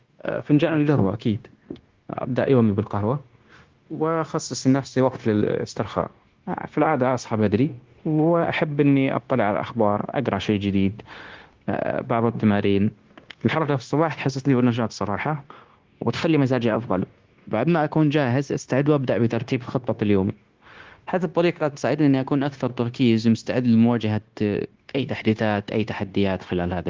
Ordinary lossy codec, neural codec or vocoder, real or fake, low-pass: Opus, 16 kbps; codec, 16 kHz, 0.9 kbps, LongCat-Audio-Codec; fake; 7.2 kHz